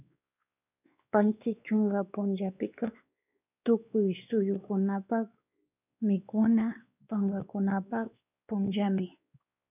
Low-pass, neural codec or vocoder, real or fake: 3.6 kHz; codec, 16 kHz, 2 kbps, X-Codec, WavLM features, trained on Multilingual LibriSpeech; fake